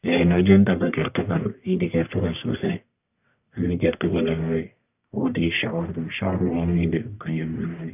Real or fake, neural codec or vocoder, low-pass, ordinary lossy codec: fake; codec, 44.1 kHz, 1.7 kbps, Pupu-Codec; 3.6 kHz; none